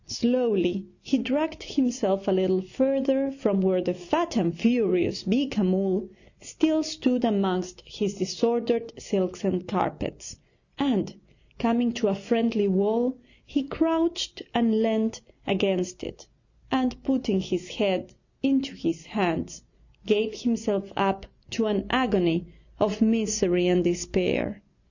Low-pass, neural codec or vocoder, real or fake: 7.2 kHz; none; real